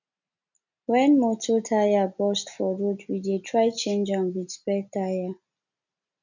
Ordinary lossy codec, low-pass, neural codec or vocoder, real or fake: none; 7.2 kHz; none; real